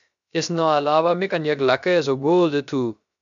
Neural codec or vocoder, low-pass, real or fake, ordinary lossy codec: codec, 16 kHz, 0.3 kbps, FocalCodec; 7.2 kHz; fake; MP3, 96 kbps